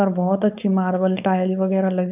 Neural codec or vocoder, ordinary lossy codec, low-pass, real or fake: codec, 16 kHz, 4.8 kbps, FACodec; none; 3.6 kHz; fake